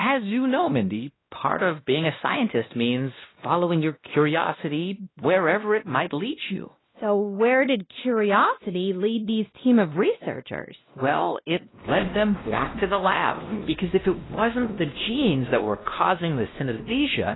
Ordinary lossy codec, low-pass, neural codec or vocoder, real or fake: AAC, 16 kbps; 7.2 kHz; codec, 16 kHz, 1 kbps, X-Codec, WavLM features, trained on Multilingual LibriSpeech; fake